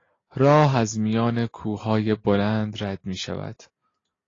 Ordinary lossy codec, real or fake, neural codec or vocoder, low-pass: AAC, 32 kbps; real; none; 7.2 kHz